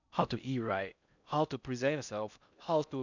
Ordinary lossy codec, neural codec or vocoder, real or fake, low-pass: none; codec, 16 kHz in and 24 kHz out, 0.6 kbps, FocalCodec, streaming, 4096 codes; fake; 7.2 kHz